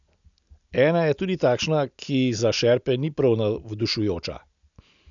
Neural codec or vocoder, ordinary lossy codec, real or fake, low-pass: none; none; real; 7.2 kHz